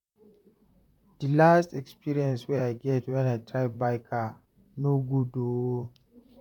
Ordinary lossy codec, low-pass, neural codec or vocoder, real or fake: none; 19.8 kHz; vocoder, 44.1 kHz, 128 mel bands, Pupu-Vocoder; fake